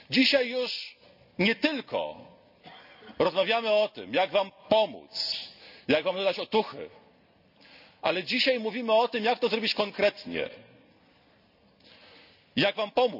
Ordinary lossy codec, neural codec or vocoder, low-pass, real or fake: none; none; 5.4 kHz; real